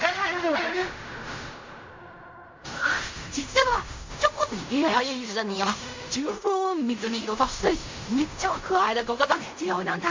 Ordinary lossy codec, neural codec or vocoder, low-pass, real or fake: MP3, 48 kbps; codec, 16 kHz in and 24 kHz out, 0.4 kbps, LongCat-Audio-Codec, fine tuned four codebook decoder; 7.2 kHz; fake